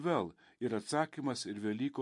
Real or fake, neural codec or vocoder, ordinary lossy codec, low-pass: real; none; MP3, 48 kbps; 10.8 kHz